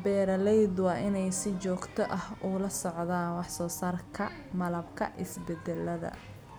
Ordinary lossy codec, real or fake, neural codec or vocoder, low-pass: none; real; none; none